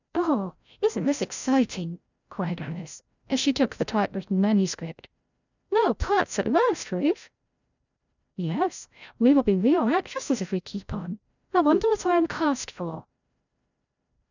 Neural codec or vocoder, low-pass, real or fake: codec, 16 kHz, 0.5 kbps, FreqCodec, larger model; 7.2 kHz; fake